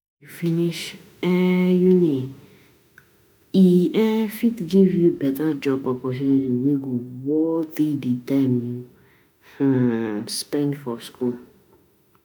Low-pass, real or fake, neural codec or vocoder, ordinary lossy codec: none; fake; autoencoder, 48 kHz, 32 numbers a frame, DAC-VAE, trained on Japanese speech; none